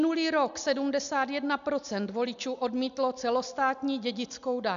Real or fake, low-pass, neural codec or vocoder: real; 7.2 kHz; none